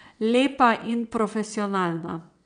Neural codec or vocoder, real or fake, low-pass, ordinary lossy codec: vocoder, 22.05 kHz, 80 mel bands, WaveNeXt; fake; 9.9 kHz; none